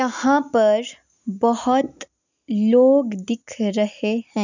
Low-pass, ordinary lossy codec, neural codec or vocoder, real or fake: 7.2 kHz; none; none; real